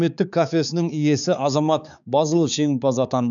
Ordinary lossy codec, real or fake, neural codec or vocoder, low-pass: none; fake; codec, 16 kHz, 4 kbps, X-Codec, HuBERT features, trained on balanced general audio; 7.2 kHz